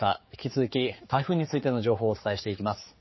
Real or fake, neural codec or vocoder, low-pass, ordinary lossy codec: fake; codec, 16 kHz, 4 kbps, X-Codec, HuBERT features, trained on general audio; 7.2 kHz; MP3, 24 kbps